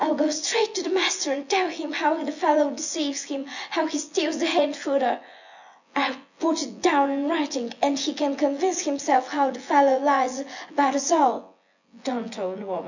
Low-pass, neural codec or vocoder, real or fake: 7.2 kHz; vocoder, 24 kHz, 100 mel bands, Vocos; fake